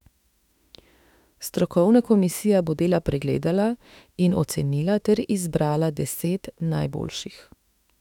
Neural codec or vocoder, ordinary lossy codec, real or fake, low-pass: autoencoder, 48 kHz, 32 numbers a frame, DAC-VAE, trained on Japanese speech; none; fake; 19.8 kHz